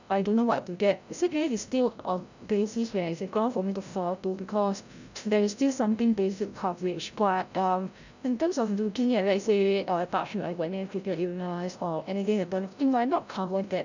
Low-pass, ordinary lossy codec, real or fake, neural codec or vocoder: 7.2 kHz; none; fake; codec, 16 kHz, 0.5 kbps, FreqCodec, larger model